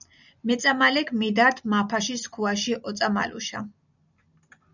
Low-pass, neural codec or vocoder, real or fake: 7.2 kHz; none; real